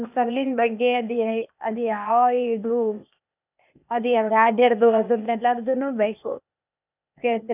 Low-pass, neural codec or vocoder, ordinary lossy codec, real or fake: 3.6 kHz; codec, 16 kHz, 0.8 kbps, ZipCodec; none; fake